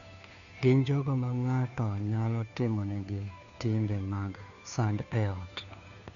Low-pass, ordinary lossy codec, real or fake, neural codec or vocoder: 7.2 kHz; none; fake; codec, 16 kHz, 2 kbps, FunCodec, trained on Chinese and English, 25 frames a second